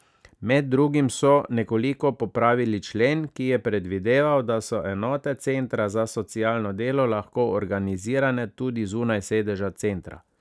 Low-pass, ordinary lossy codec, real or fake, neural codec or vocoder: none; none; real; none